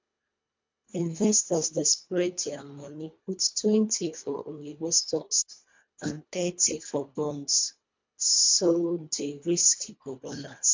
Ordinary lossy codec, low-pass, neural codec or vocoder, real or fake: MP3, 64 kbps; 7.2 kHz; codec, 24 kHz, 1.5 kbps, HILCodec; fake